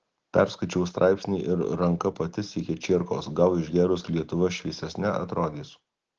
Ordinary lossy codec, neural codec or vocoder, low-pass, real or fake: Opus, 16 kbps; none; 7.2 kHz; real